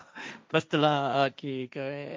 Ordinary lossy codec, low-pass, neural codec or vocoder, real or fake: none; none; codec, 16 kHz, 1.1 kbps, Voila-Tokenizer; fake